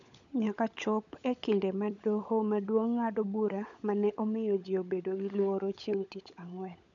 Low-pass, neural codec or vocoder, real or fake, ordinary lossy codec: 7.2 kHz; codec, 16 kHz, 16 kbps, FunCodec, trained on LibriTTS, 50 frames a second; fake; none